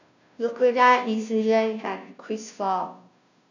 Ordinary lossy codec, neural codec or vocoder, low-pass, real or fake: none; codec, 16 kHz, 0.5 kbps, FunCodec, trained on Chinese and English, 25 frames a second; 7.2 kHz; fake